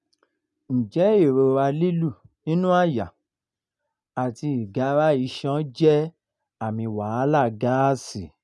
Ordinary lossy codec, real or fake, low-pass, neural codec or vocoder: none; real; none; none